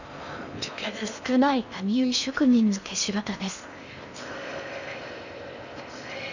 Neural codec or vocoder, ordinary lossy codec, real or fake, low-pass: codec, 16 kHz in and 24 kHz out, 0.6 kbps, FocalCodec, streaming, 4096 codes; none; fake; 7.2 kHz